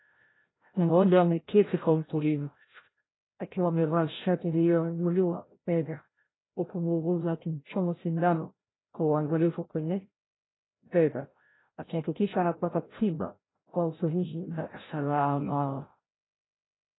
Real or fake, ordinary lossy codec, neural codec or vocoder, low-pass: fake; AAC, 16 kbps; codec, 16 kHz, 0.5 kbps, FreqCodec, larger model; 7.2 kHz